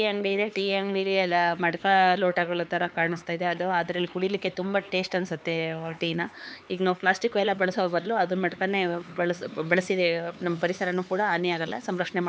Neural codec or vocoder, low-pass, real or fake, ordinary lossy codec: codec, 16 kHz, 4 kbps, X-Codec, HuBERT features, trained on LibriSpeech; none; fake; none